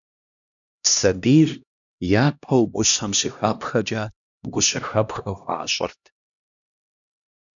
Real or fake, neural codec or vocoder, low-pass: fake; codec, 16 kHz, 1 kbps, X-Codec, HuBERT features, trained on LibriSpeech; 7.2 kHz